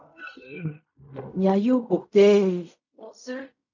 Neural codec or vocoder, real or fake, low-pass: codec, 16 kHz in and 24 kHz out, 0.4 kbps, LongCat-Audio-Codec, fine tuned four codebook decoder; fake; 7.2 kHz